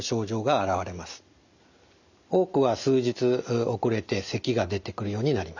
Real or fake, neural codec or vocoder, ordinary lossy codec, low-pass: real; none; none; 7.2 kHz